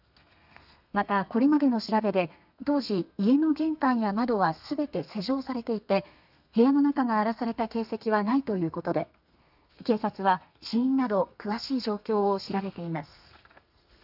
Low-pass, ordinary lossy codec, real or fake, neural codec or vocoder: 5.4 kHz; none; fake; codec, 32 kHz, 1.9 kbps, SNAC